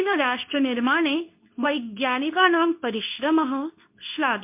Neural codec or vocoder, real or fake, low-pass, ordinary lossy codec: codec, 24 kHz, 0.9 kbps, WavTokenizer, medium speech release version 2; fake; 3.6 kHz; MP3, 32 kbps